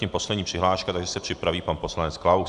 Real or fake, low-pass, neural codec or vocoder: real; 10.8 kHz; none